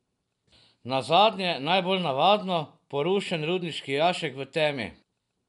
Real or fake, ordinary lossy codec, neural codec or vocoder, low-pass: real; none; none; 10.8 kHz